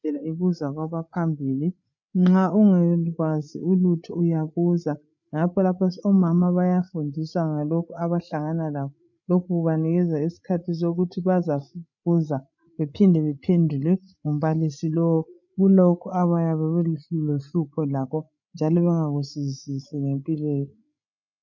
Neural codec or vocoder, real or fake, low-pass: codec, 16 kHz, 8 kbps, FreqCodec, larger model; fake; 7.2 kHz